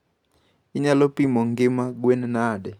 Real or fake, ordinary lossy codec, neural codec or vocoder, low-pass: real; none; none; 19.8 kHz